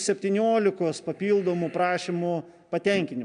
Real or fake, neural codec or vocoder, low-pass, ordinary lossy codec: real; none; 9.9 kHz; AAC, 96 kbps